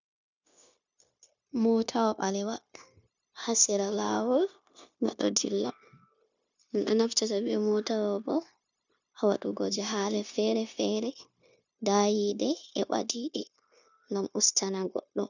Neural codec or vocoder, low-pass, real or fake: codec, 16 kHz, 0.9 kbps, LongCat-Audio-Codec; 7.2 kHz; fake